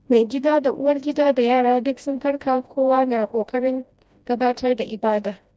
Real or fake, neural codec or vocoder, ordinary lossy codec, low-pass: fake; codec, 16 kHz, 1 kbps, FreqCodec, smaller model; none; none